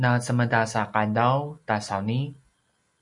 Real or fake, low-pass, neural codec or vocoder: real; 10.8 kHz; none